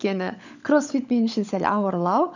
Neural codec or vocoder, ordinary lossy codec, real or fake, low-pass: codec, 16 kHz, 16 kbps, FunCodec, trained on LibriTTS, 50 frames a second; none; fake; 7.2 kHz